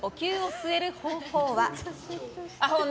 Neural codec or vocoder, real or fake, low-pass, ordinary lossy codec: none; real; none; none